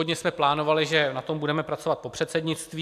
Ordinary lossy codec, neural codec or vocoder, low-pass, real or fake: AAC, 96 kbps; none; 14.4 kHz; real